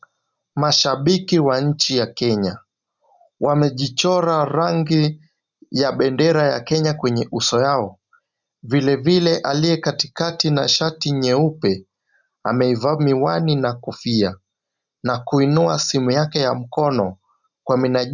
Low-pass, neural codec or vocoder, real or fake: 7.2 kHz; none; real